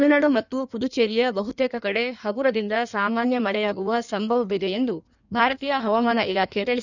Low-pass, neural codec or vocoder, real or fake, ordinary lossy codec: 7.2 kHz; codec, 16 kHz in and 24 kHz out, 1.1 kbps, FireRedTTS-2 codec; fake; none